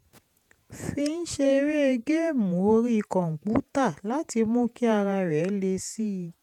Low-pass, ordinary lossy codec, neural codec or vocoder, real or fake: 19.8 kHz; none; vocoder, 48 kHz, 128 mel bands, Vocos; fake